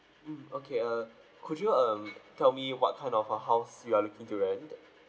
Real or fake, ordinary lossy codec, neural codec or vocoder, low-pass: real; none; none; none